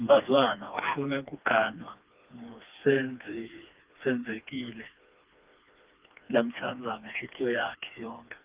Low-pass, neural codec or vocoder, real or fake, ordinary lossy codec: 3.6 kHz; codec, 16 kHz, 2 kbps, FreqCodec, smaller model; fake; Opus, 24 kbps